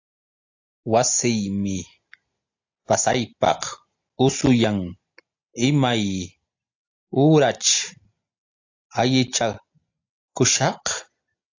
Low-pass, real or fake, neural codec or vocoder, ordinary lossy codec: 7.2 kHz; real; none; AAC, 48 kbps